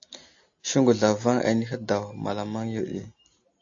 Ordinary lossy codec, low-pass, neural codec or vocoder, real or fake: AAC, 48 kbps; 7.2 kHz; none; real